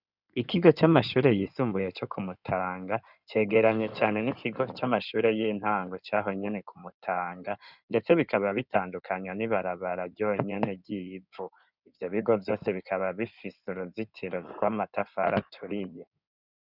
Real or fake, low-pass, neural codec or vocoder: fake; 5.4 kHz; codec, 16 kHz in and 24 kHz out, 2.2 kbps, FireRedTTS-2 codec